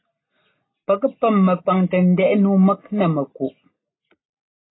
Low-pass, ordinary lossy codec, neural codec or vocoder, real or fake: 7.2 kHz; AAC, 16 kbps; none; real